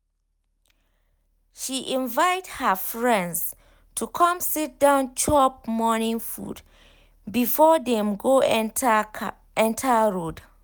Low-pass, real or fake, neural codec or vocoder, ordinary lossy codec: none; real; none; none